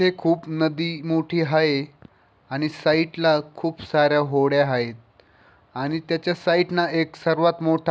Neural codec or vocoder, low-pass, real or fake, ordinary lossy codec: none; none; real; none